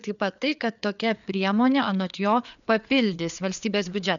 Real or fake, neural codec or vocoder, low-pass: fake; codec, 16 kHz, 4 kbps, FreqCodec, larger model; 7.2 kHz